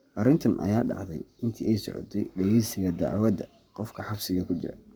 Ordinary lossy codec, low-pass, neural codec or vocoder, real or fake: none; none; codec, 44.1 kHz, 7.8 kbps, Pupu-Codec; fake